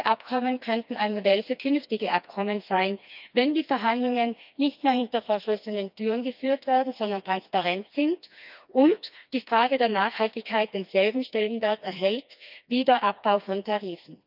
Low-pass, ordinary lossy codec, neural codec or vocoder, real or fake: 5.4 kHz; none; codec, 16 kHz, 2 kbps, FreqCodec, smaller model; fake